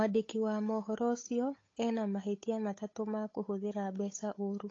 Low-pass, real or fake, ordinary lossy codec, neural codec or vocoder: 7.2 kHz; fake; AAC, 32 kbps; codec, 16 kHz, 8 kbps, FunCodec, trained on Chinese and English, 25 frames a second